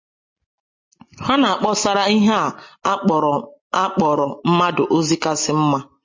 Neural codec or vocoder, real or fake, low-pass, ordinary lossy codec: none; real; 7.2 kHz; MP3, 32 kbps